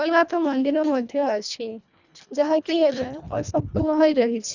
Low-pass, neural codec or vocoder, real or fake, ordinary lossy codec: 7.2 kHz; codec, 24 kHz, 1.5 kbps, HILCodec; fake; none